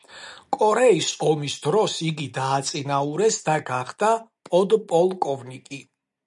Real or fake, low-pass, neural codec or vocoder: real; 10.8 kHz; none